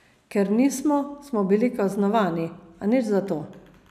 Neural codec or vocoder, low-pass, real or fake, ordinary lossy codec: none; 14.4 kHz; real; none